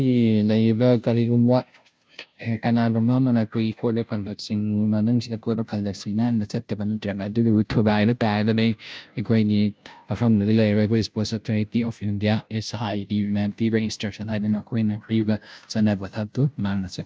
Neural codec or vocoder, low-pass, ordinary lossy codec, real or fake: codec, 16 kHz, 0.5 kbps, FunCodec, trained on Chinese and English, 25 frames a second; none; none; fake